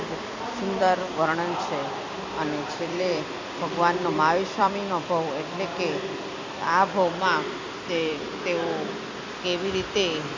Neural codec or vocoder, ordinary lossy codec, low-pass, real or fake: vocoder, 44.1 kHz, 128 mel bands every 256 samples, BigVGAN v2; MP3, 64 kbps; 7.2 kHz; fake